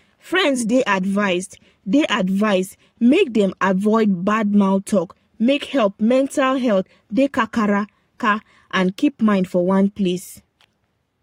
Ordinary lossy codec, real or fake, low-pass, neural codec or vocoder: AAC, 48 kbps; fake; 19.8 kHz; codec, 44.1 kHz, 7.8 kbps, Pupu-Codec